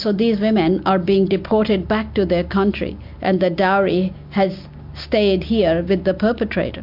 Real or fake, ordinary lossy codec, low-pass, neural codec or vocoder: real; MP3, 48 kbps; 5.4 kHz; none